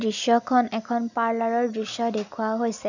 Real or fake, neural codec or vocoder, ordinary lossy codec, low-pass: real; none; none; 7.2 kHz